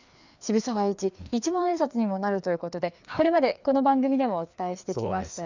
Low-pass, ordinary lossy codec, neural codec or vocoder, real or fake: 7.2 kHz; none; codec, 16 kHz, 2 kbps, FreqCodec, larger model; fake